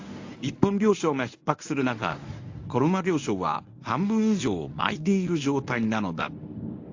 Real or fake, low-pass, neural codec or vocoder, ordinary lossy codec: fake; 7.2 kHz; codec, 24 kHz, 0.9 kbps, WavTokenizer, medium speech release version 1; AAC, 48 kbps